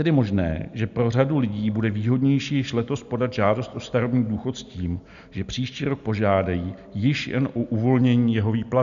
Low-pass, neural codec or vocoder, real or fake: 7.2 kHz; none; real